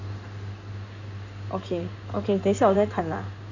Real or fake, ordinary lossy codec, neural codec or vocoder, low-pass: fake; none; codec, 16 kHz in and 24 kHz out, 2.2 kbps, FireRedTTS-2 codec; 7.2 kHz